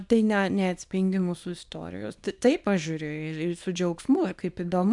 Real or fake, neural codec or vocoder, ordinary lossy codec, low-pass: fake; codec, 24 kHz, 0.9 kbps, WavTokenizer, small release; MP3, 96 kbps; 10.8 kHz